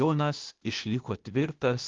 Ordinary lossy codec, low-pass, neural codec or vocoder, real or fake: Opus, 32 kbps; 7.2 kHz; codec, 16 kHz, 0.8 kbps, ZipCodec; fake